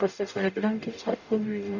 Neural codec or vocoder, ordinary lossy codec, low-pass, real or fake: codec, 44.1 kHz, 0.9 kbps, DAC; none; 7.2 kHz; fake